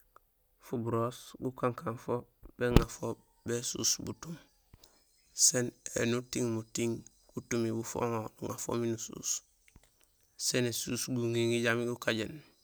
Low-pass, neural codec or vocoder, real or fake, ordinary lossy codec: none; none; real; none